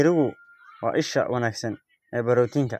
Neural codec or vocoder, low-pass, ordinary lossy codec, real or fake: none; 14.4 kHz; none; real